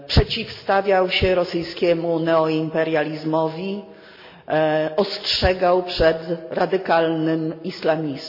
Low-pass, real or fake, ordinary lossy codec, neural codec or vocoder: 5.4 kHz; real; MP3, 32 kbps; none